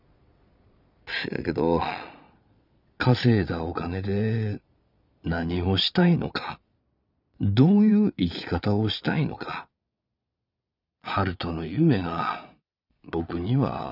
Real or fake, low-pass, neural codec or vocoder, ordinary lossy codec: fake; 5.4 kHz; vocoder, 22.05 kHz, 80 mel bands, Vocos; none